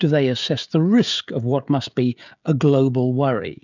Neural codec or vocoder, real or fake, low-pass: codec, 16 kHz, 16 kbps, FreqCodec, smaller model; fake; 7.2 kHz